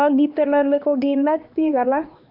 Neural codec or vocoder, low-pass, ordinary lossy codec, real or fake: codec, 16 kHz, 2 kbps, X-Codec, HuBERT features, trained on LibriSpeech; 5.4 kHz; MP3, 48 kbps; fake